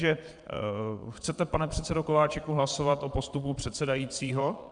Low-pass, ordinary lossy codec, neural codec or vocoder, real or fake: 9.9 kHz; MP3, 96 kbps; vocoder, 22.05 kHz, 80 mel bands, Vocos; fake